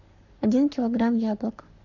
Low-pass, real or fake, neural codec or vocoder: 7.2 kHz; fake; codec, 44.1 kHz, 7.8 kbps, Pupu-Codec